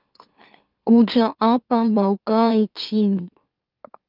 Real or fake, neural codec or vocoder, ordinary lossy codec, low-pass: fake; autoencoder, 44.1 kHz, a latent of 192 numbers a frame, MeloTTS; Opus, 32 kbps; 5.4 kHz